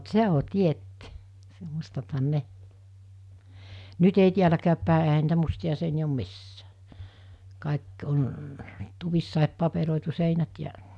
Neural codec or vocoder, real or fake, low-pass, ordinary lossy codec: none; real; none; none